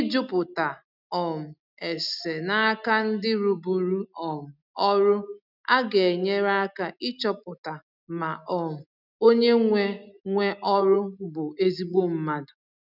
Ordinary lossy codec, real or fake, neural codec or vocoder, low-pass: none; real; none; 5.4 kHz